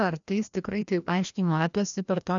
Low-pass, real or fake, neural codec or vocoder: 7.2 kHz; fake; codec, 16 kHz, 1 kbps, FreqCodec, larger model